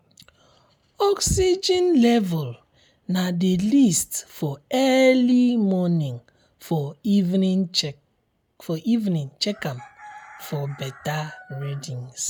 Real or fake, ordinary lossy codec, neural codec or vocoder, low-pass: real; none; none; none